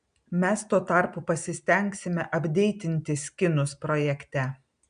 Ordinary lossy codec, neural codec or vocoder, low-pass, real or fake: MP3, 96 kbps; none; 9.9 kHz; real